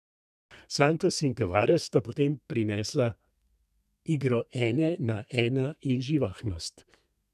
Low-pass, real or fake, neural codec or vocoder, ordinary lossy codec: 14.4 kHz; fake; codec, 32 kHz, 1.9 kbps, SNAC; none